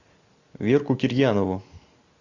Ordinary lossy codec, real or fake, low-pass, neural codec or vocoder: AAC, 48 kbps; real; 7.2 kHz; none